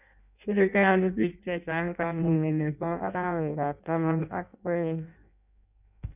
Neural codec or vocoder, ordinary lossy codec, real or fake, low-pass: codec, 16 kHz in and 24 kHz out, 0.6 kbps, FireRedTTS-2 codec; none; fake; 3.6 kHz